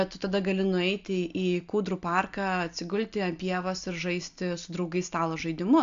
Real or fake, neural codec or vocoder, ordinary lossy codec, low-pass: real; none; AAC, 64 kbps; 7.2 kHz